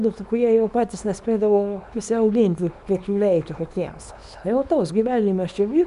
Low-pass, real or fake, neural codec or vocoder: 10.8 kHz; fake; codec, 24 kHz, 0.9 kbps, WavTokenizer, small release